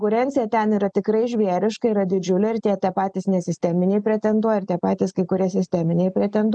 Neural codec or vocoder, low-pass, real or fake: none; 9.9 kHz; real